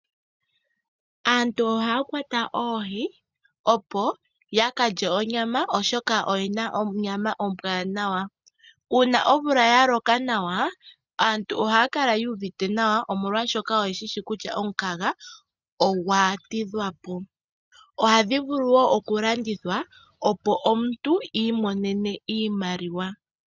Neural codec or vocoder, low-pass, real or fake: none; 7.2 kHz; real